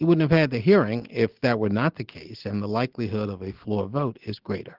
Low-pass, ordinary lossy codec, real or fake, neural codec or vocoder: 5.4 kHz; Opus, 16 kbps; real; none